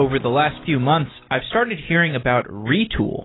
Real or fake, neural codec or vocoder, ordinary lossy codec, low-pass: real; none; AAC, 16 kbps; 7.2 kHz